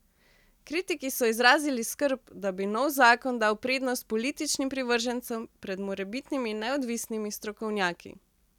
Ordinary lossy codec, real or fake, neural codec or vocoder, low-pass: none; real; none; 19.8 kHz